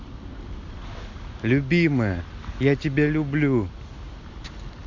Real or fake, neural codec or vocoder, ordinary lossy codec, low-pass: real; none; MP3, 48 kbps; 7.2 kHz